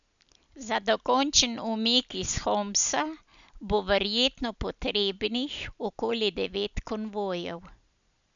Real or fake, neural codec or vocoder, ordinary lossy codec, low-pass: real; none; none; 7.2 kHz